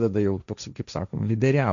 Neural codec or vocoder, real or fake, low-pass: codec, 16 kHz, 1.1 kbps, Voila-Tokenizer; fake; 7.2 kHz